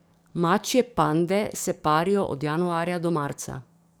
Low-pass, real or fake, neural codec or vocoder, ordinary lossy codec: none; fake; codec, 44.1 kHz, 7.8 kbps, DAC; none